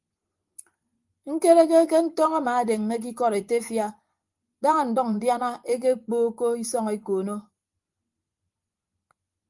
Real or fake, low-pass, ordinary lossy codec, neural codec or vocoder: fake; 10.8 kHz; Opus, 32 kbps; vocoder, 24 kHz, 100 mel bands, Vocos